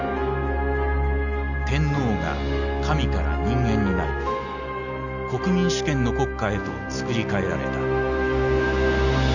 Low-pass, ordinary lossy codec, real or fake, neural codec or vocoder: 7.2 kHz; none; real; none